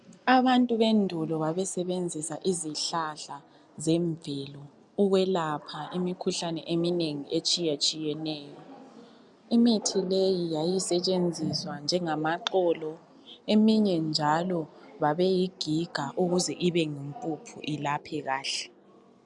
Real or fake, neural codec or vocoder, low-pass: real; none; 10.8 kHz